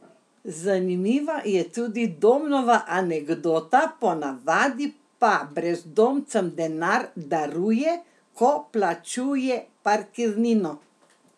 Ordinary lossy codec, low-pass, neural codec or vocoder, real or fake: none; none; none; real